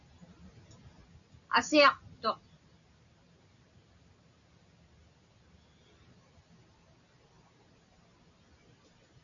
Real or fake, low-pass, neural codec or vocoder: real; 7.2 kHz; none